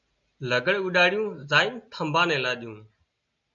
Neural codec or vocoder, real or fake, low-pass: none; real; 7.2 kHz